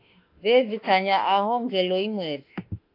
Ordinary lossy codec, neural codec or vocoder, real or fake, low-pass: AAC, 32 kbps; autoencoder, 48 kHz, 32 numbers a frame, DAC-VAE, trained on Japanese speech; fake; 5.4 kHz